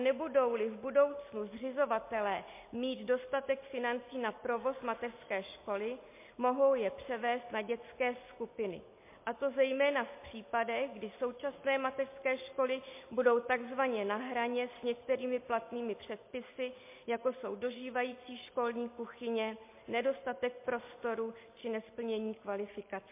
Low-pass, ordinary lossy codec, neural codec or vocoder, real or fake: 3.6 kHz; MP3, 24 kbps; none; real